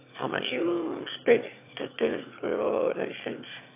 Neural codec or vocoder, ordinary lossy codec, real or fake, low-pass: autoencoder, 22.05 kHz, a latent of 192 numbers a frame, VITS, trained on one speaker; AAC, 24 kbps; fake; 3.6 kHz